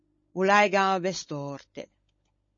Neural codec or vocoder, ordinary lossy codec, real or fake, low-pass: codec, 16 kHz, 16 kbps, FunCodec, trained on LibriTTS, 50 frames a second; MP3, 32 kbps; fake; 7.2 kHz